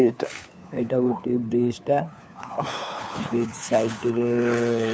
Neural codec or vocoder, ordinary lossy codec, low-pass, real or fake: codec, 16 kHz, 4 kbps, FunCodec, trained on LibriTTS, 50 frames a second; none; none; fake